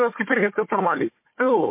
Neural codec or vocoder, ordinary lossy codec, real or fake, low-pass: codec, 16 kHz, 4 kbps, FunCodec, trained on Chinese and English, 50 frames a second; MP3, 24 kbps; fake; 3.6 kHz